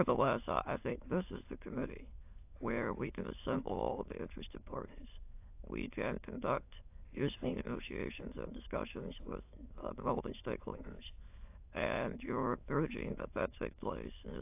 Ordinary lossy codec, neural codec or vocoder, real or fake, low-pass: AAC, 32 kbps; autoencoder, 22.05 kHz, a latent of 192 numbers a frame, VITS, trained on many speakers; fake; 3.6 kHz